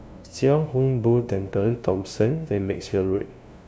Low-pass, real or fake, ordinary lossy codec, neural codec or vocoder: none; fake; none; codec, 16 kHz, 0.5 kbps, FunCodec, trained on LibriTTS, 25 frames a second